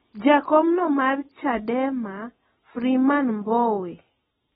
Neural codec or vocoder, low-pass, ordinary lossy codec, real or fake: none; 19.8 kHz; AAC, 16 kbps; real